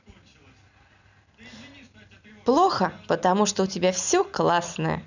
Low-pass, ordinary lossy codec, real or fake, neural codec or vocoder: 7.2 kHz; none; real; none